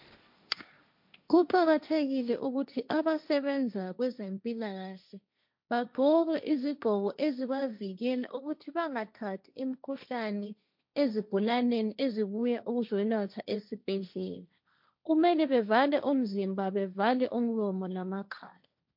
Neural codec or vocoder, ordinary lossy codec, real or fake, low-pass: codec, 16 kHz, 1.1 kbps, Voila-Tokenizer; MP3, 48 kbps; fake; 5.4 kHz